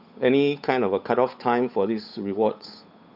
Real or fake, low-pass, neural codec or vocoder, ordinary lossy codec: fake; 5.4 kHz; codec, 16 kHz, 8 kbps, FunCodec, trained on Chinese and English, 25 frames a second; none